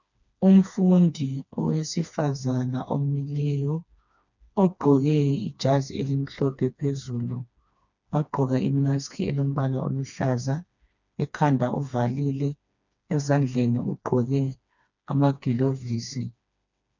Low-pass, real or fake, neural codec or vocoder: 7.2 kHz; fake; codec, 16 kHz, 2 kbps, FreqCodec, smaller model